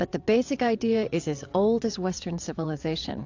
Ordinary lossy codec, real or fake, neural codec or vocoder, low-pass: AAC, 48 kbps; fake; vocoder, 22.05 kHz, 80 mel bands, WaveNeXt; 7.2 kHz